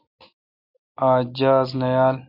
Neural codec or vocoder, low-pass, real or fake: none; 5.4 kHz; real